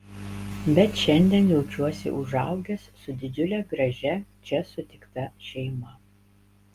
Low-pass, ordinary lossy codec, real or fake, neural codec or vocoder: 14.4 kHz; Opus, 32 kbps; real; none